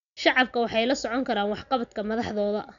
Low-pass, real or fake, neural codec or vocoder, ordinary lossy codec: 7.2 kHz; real; none; none